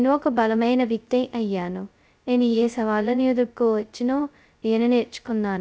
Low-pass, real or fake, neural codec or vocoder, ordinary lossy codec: none; fake; codec, 16 kHz, 0.2 kbps, FocalCodec; none